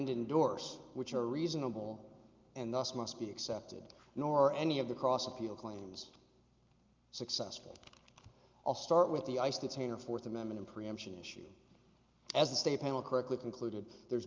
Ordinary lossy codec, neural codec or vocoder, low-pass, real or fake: Opus, 24 kbps; none; 7.2 kHz; real